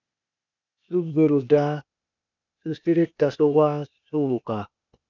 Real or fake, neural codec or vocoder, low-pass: fake; codec, 16 kHz, 0.8 kbps, ZipCodec; 7.2 kHz